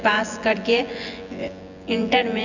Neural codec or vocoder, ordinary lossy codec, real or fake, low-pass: vocoder, 24 kHz, 100 mel bands, Vocos; none; fake; 7.2 kHz